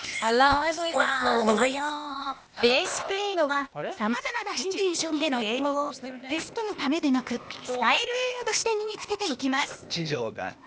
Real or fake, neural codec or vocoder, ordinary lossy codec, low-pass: fake; codec, 16 kHz, 0.8 kbps, ZipCodec; none; none